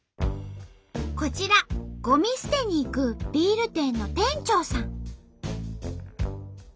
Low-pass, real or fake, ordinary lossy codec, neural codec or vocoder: none; real; none; none